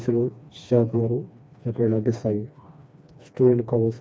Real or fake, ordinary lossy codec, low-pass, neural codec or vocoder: fake; none; none; codec, 16 kHz, 2 kbps, FreqCodec, smaller model